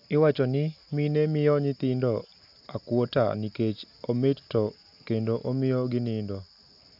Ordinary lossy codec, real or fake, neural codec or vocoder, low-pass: none; real; none; 5.4 kHz